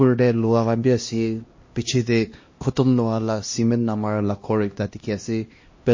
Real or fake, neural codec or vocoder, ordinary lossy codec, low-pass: fake; codec, 16 kHz, 1 kbps, X-Codec, HuBERT features, trained on LibriSpeech; MP3, 32 kbps; 7.2 kHz